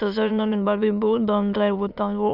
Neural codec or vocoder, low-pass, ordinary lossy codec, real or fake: autoencoder, 22.05 kHz, a latent of 192 numbers a frame, VITS, trained on many speakers; 5.4 kHz; none; fake